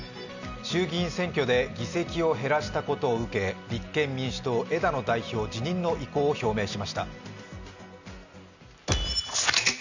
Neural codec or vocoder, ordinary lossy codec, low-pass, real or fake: none; none; 7.2 kHz; real